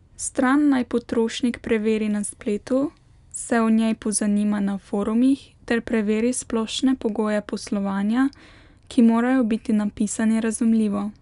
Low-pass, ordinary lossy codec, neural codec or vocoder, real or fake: 10.8 kHz; none; none; real